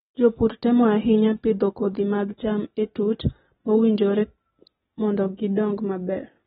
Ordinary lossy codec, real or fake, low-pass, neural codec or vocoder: AAC, 16 kbps; real; 19.8 kHz; none